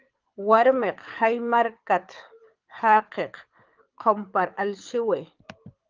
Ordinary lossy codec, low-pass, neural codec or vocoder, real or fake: Opus, 24 kbps; 7.2 kHz; codec, 24 kHz, 6 kbps, HILCodec; fake